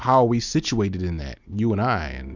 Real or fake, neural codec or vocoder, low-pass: real; none; 7.2 kHz